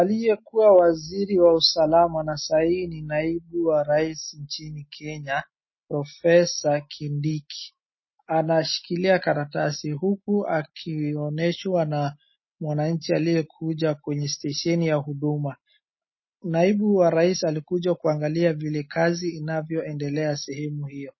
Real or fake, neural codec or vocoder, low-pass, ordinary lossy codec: real; none; 7.2 kHz; MP3, 24 kbps